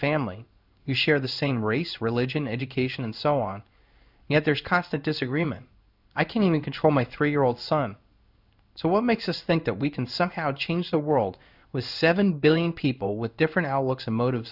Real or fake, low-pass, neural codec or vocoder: fake; 5.4 kHz; codec, 16 kHz in and 24 kHz out, 1 kbps, XY-Tokenizer